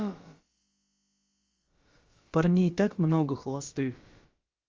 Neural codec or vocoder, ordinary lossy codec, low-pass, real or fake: codec, 16 kHz, about 1 kbps, DyCAST, with the encoder's durations; Opus, 32 kbps; 7.2 kHz; fake